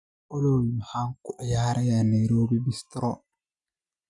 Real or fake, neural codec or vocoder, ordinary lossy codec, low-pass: real; none; none; 10.8 kHz